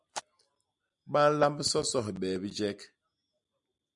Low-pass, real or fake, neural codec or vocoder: 10.8 kHz; real; none